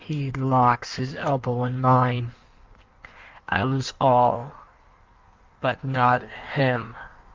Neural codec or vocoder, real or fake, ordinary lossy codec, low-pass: codec, 16 kHz in and 24 kHz out, 1.1 kbps, FireRedTTS-2 codec; fake; Opus, 32 kbps; 7.2 kHz